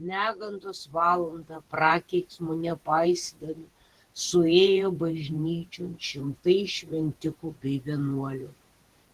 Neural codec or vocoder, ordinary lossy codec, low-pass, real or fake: vocoder, 48 kHz, 128 mel bands, Vocos; Opus, 16 kbps; 14.4 kHz; fake